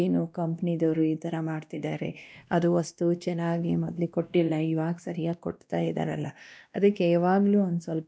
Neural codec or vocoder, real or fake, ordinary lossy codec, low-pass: codec, 16 kHz, 1 kbps, X-Codec, WavLM features, trained on Multilingual LibriSpeech; fake; none; none